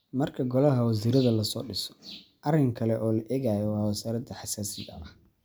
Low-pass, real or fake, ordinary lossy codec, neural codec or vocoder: none; real; none; none